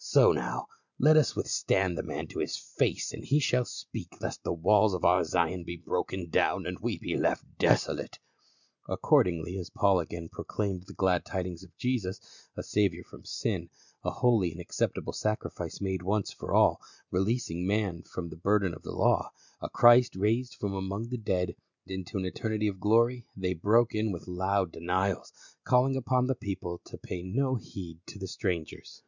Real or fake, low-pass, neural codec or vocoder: real; 7.2 kHz; none